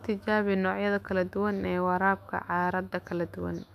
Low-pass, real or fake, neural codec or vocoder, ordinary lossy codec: 14.4 kHz; fake; autoencoder, 48 kHz, 128 numbers a frame, DAC-VAE, trained on Japanese speech; none